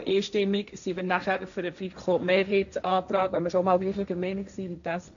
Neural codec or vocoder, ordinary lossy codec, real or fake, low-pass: codec, 16 kHz, 1.1 kbps, Voila-Tokenizer; none; fake; 7.2 kHz